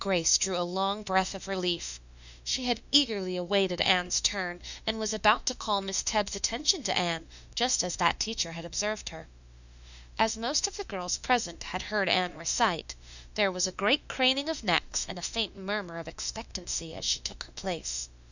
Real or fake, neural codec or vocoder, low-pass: fake; autoencoder, 48 kHz, 32 numbers a frame, DAC-VAE, trained on Japanese speech; 7.2 kHz